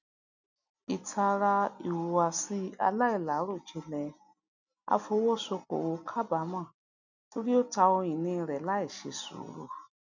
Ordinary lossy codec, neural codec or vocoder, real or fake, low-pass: none; none; real; 7.2 kHz